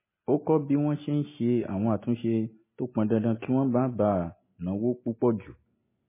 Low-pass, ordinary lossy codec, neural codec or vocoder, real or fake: 3.6 kHz; MP3, 16 kbps; none; real